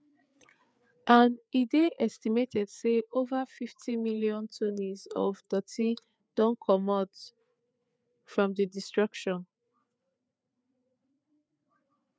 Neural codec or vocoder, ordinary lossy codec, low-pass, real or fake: codec, 16 kHz, 4 kbps, FreqCodec, larger model; none; none; fake